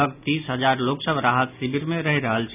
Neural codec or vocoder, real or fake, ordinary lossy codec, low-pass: none; real; none; 3.6 kHz